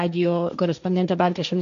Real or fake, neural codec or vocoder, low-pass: fake; codec, 16 kHz, 1.1 kbps, Voila-Tokenizer; 7.2 kHz